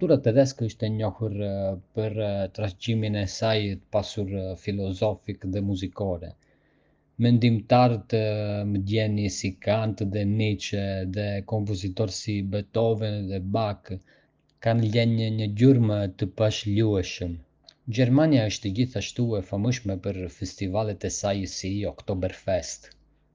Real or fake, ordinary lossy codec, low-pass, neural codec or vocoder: real; Opus, 24 kbps; 7.2 kHz; none